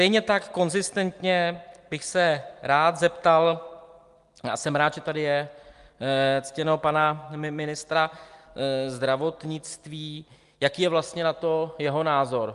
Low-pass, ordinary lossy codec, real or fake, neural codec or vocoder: 10.8 kHz; Opus, 32 kbps; real; none